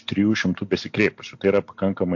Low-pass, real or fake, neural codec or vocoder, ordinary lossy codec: 7.2 kHz; real; none; MP3, 48 kbps